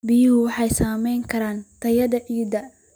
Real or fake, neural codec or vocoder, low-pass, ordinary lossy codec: real; none; none; none